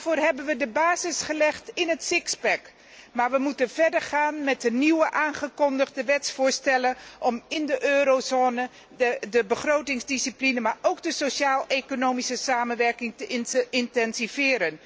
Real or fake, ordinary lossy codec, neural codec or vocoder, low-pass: real; none; none; none